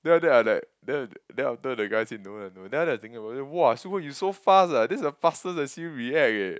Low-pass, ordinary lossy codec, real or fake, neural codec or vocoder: none; none; real; none